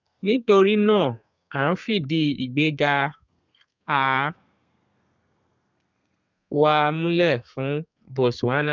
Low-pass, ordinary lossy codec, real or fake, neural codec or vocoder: 7.2 kHz; none; fake; codec, 32 kHz, 1.9 kbps, SNAC